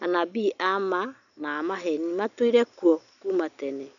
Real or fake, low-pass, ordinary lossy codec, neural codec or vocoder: real; 7.2 kHz; none; none